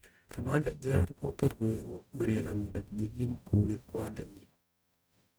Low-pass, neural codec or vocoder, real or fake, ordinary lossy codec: none; codec, 44.1 kHz, 0.9 kbps, DAC; fake; none